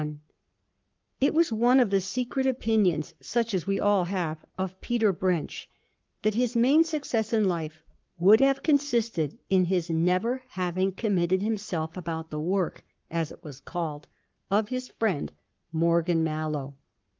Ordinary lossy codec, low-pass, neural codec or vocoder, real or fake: Opus, 32 kbps; 7.2 kHz; codec, 44.1 kHz, 7.8 kbps, Pupu-Codec; fake